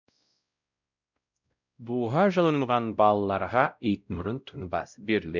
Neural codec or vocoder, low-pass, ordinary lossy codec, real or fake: codec, 16 kHz, 0.5 kbps, X-Codec, WavLM features, trained on Multilingual LibriSpeech; 7.2 kHz; none; fake